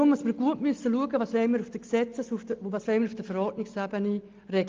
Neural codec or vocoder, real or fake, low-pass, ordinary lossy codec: none; real; 7.2 kHz; Opus, 32 kbps